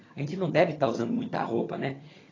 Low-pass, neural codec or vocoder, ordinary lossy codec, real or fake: 7.2 kHz; vocoder, 22.05 kHz, 80 mel bands, HiFi-GAN; AAC, 32 kbps; fake